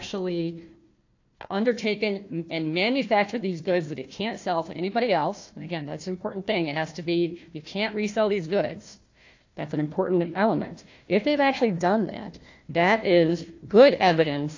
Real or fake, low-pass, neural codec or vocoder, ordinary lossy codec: fake; 7.2 kHz; codec, 16 kHz, 1 kbps, FunCodec, trained on Chinese and English, 50 frames a second; AAC, 48 kbps